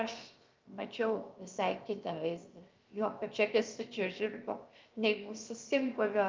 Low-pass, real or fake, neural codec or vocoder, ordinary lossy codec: 7.2 kHz; fake; codec, 16 kHz, 0.7 kbps, FocalCodec; Opus, 32 kbps